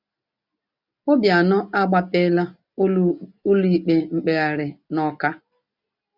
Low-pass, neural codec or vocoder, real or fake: 5.4 kHz; none; real